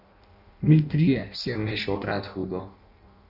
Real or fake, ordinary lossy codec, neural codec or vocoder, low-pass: fake; none; codec, 16 kHz in and 24 kHz out, 0.6 kbps, FireRedTTS-2 codec; 5.4 kHz